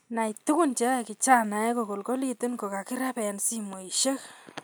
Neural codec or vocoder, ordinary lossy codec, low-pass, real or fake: none; none; none; real